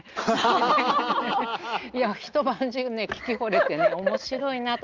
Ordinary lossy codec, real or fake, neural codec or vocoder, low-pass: Opus, 32 kbps; real; none; 7.2 kHz